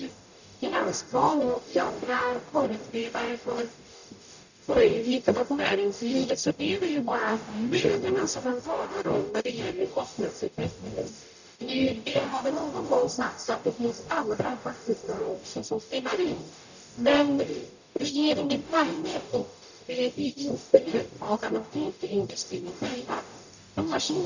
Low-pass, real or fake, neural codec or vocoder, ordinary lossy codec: 7.2 kHz; fake; codec, 44.1 kHz, 0.9 kbps, DAC; none